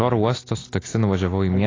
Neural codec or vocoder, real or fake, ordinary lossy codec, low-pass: none; real; AAC, 32 kbps; 7.2 kHz